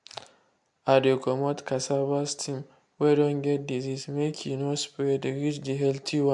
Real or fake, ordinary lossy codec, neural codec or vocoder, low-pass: real; MP3, 64 kbps; none; 10.8 kHz